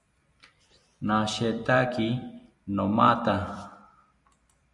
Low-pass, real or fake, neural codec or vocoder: 10.8 kHz; fake; vocoder, 44.1 kHz, 128 mel bands every 256 samples, BigVGAN v2